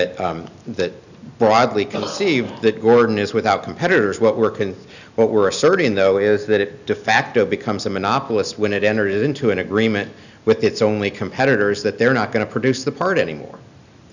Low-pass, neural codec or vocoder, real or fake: 7.2 kHz; none; real